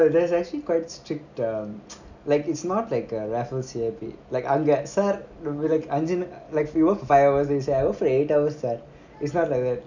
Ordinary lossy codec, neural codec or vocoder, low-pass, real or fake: none; none; 7.2 kHz; real